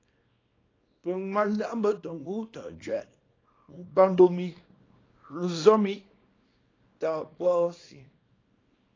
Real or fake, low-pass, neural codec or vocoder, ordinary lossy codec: fake; 7.2 kHz; codec, 24 kHz, 0.9 kbps, WavTokenizer, small release; AAC, 32 kbps